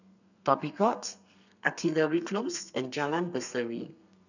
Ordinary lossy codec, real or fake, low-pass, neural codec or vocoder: none; fake; 7.2 kHz; codec, 32 kHz, 1.9 kbps, SNAC